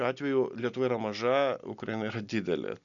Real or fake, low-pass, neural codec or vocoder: real; 7.2 kHz; none